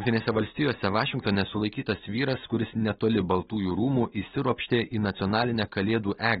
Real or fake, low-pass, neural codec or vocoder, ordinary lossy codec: real; 14.4 kHz; none; AAC, 16 kbps